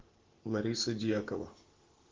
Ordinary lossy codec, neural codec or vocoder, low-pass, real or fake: Opus, 16 kbps; none; 7.2 kHz; real